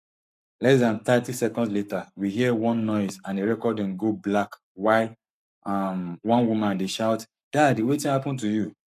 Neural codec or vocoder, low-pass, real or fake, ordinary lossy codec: codec, 44.1 kHz, 7.8 kbps, Pupu-Codec; 14.4 kHz; fake; none